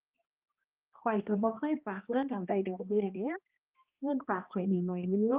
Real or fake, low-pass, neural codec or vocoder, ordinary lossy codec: fake; 3.6 kHz; codec, 16 kHz, 1 kbps, X-Codec, HuBERT features, trained on balanced general audio; Opus, 24 kbps